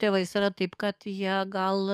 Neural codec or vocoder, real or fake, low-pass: codec, 44.1 kHz, 7.8 kbps, DAC; fake; 14.4 kHz